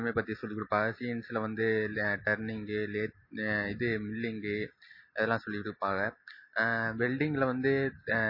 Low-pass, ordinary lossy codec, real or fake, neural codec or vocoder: 5.4 kHz; MP3, 24 kbps; real; none